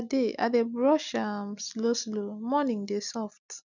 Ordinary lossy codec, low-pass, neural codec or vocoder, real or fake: none; 7.2 kHz; none; real